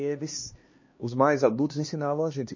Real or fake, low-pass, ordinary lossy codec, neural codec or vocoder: fake; 7.2 kHz; MP3, 32 kbps; codec, 16 kHz, 2 kbps, X-Codec, HuBERT features, trained on LibriSpeech